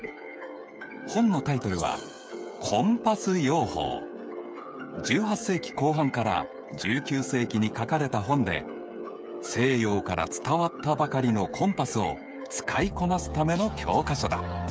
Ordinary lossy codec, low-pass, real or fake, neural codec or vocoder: none; none; fake; codec, 16 kHz, 8 kbps, FreqCodec, smaller model